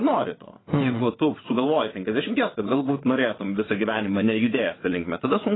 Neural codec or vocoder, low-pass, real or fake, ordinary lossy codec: vocoder, 22.05 kHz, 80 mel bands, WaveNeXt; 7.2 kHz; fake; AAC, 16 kbps